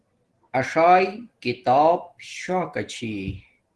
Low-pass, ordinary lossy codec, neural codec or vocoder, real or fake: 10.8 kHz; Opus, 16 kbps; none; real